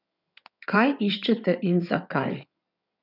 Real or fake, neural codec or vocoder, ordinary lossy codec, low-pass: fake; codec, 16 kHz in and 24 kHz out, 2.2 kbps, FireRedTTS-2 codec; none; 5.4 kHz